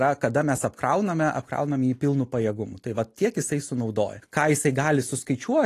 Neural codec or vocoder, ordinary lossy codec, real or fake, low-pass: none; AAC, 48 kbps; real; 14.4 kHz